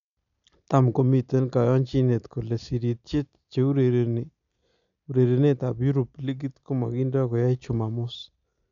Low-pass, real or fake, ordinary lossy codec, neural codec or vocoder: 7.2 kHz; real; none; none